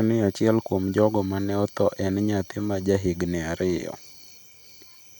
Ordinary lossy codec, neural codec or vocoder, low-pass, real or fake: none; none; 19.8 kHz; real